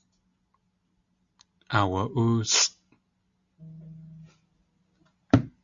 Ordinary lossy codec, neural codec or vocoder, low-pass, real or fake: Opus, 64 kbps; none; 7.2 kHz; real